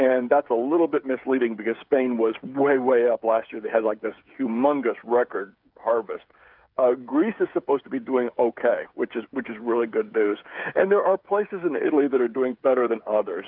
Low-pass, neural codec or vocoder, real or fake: 5.4 kHz; codec, 16 kHz, 8 kbps, FreqCodec, smaller model; fake